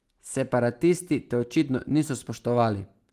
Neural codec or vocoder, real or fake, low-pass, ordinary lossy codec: none; real; 14.4 kHz; Opus, 24 kbps